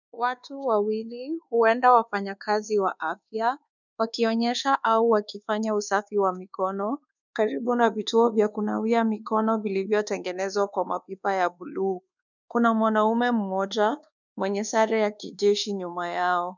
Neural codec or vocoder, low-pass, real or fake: codec, 24 kHz, 1.2 kbps, DualCodec; 7.2 kHz; fake